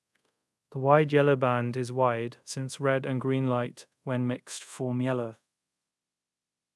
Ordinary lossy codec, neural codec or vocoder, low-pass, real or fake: none; codec, 24 kHz, 0.5 kbps, DualCodec; none; fake